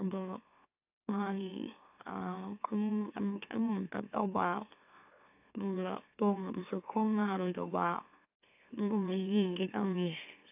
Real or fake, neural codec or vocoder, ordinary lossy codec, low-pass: fake; autoencoder, 44.1 kHz, a latent of 192 numbers a frame, MeloTTS; AAC, 32 kbps; 3.6 kHz